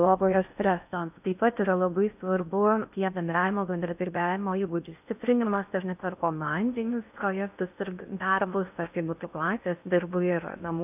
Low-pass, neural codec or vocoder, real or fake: 3.6 kHz; codec, 16 kHz in and 24 kHz out, 0.6 kbps, FocalCodec, streaming, 4096 codes; fake